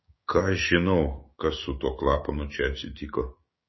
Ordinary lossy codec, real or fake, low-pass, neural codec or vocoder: MP3, 24 kbps; fake; 7.2 kHz; codec, 16 kHz, 6 kbps, DAC